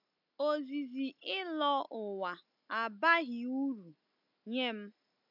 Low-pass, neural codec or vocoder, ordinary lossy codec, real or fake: 5.4 kHz; none; MP3, 48 kbps; real